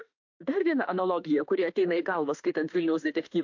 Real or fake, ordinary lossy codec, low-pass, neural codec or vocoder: fake; AAC, 48 kbps; 7.2 kHz; autoencoder, 48 kHz, 32 numbers a frame, DAC-VAE, trained on Japanese speech